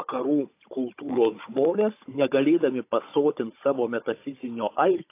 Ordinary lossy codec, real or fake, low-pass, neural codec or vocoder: AAC, 24 kbps; fake; 3.6 kHz; codec, 16 kHz, 16 kbps, FunCodec, trained on Chinese and English, 50 frames a second